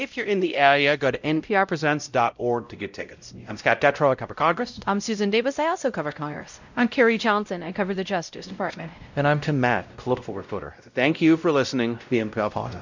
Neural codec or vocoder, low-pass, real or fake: codec, 16 kHz, 0.5 kbps, X-Codec, WavLM features, trained on Multilingual LibriSpeech; 7.2 kHz; fake